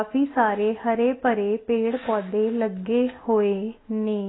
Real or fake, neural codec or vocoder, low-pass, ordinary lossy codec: real; none; 7.2 kHz; AAC, 16 kbps